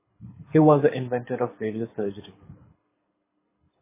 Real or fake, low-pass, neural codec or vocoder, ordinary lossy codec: fake; 3.6 kHz; codec, 24 kHz, 6 kbps, HILCodec; MP3, 16 kbps